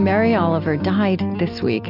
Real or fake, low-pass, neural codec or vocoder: real; 5.4 kHz; none